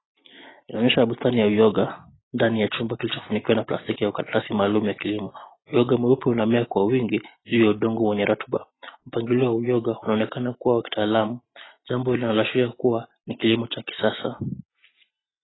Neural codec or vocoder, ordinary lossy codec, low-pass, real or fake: none; AAC, 16 kbps; 7.2 kHz; real